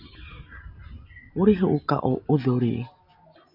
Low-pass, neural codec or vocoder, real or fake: 5.4 kHz; none; real